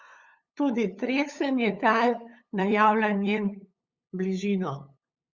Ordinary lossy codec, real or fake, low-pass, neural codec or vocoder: Opus, 64 kbps; fake; 7.2 kHz; codec, 16 kHz, 8 kbps, FunCodec, trained on LibriTTS, 25 frames a second